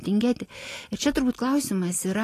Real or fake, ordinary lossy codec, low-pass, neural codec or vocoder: real; AAC, 48 kbps; 14.4 kHz; none